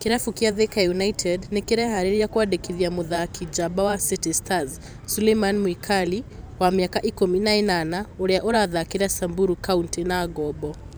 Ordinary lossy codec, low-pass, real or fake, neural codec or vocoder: none; none; fake; vocoder, 44.1 kHz, 128 mel bands every 512 samples, BigVGAN v2